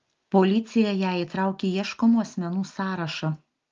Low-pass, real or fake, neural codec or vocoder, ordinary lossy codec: 7.2 kHz; real; none; Opus, 32 kbps